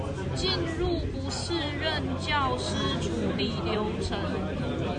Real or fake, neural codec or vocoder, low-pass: real; none; 9.9 kHz